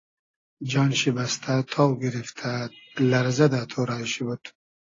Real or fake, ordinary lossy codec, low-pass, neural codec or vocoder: real; AAC, 32 kbps; 7.2 kHz; none